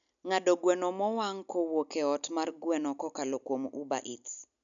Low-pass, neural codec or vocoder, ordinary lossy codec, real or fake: 7.2 kHz; none; none; real